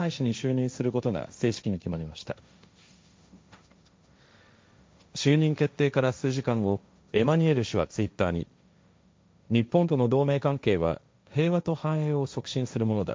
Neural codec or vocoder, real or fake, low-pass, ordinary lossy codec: codec, 16 kHz, 1.1 kbps, Voila-Tokenizer; fake; none; none